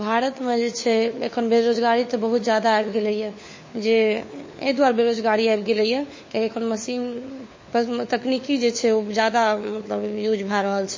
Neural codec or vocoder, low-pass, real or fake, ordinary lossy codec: codec, 16 kHz, 4 kbps, FunCodec, trained on LibriTTS, 50 frames a second; 7.2 kHz; fake; MP3, 32 kbps